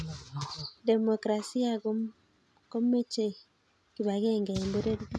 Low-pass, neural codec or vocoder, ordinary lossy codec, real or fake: none; none; none; real